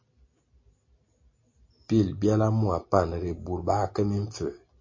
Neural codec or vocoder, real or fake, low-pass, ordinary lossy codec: none; real; 7.2 kHz; MP3, 32 kbps